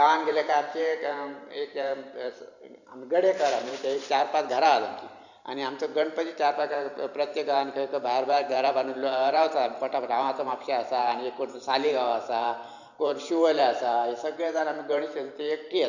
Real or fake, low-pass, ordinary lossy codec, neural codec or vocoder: fake; 7.2 kHz; none; vocoder, 44.1 kHz, 128 mel bands every 512 samples, BigVGAN v2